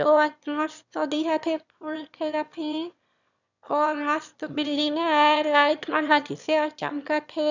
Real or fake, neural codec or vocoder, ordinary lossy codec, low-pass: fake; autoencoder, 22.05 kHz, a latent of 192 numbers a frame, VITS, trained on one speaker; none; 7.2 kHz